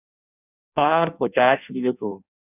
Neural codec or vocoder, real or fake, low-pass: codec, 16 kHz in and 24 kHz out, 0.6 kbps, FireRedTTS-2 codec; fake; 3.6 kHz